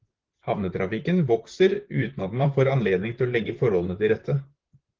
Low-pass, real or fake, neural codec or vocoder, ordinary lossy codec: 7.2 kHz; fake; vocoder, 44.1 kHz, 128 mel bands, Pupu-Vocoder; Opus, 16 kbps